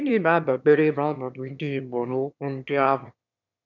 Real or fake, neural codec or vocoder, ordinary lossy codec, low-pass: fake; autoencoder, 22.05 kHz, a latent of 192 numbers a frame, VITS, trained on one speaker; none; 7.2 kHz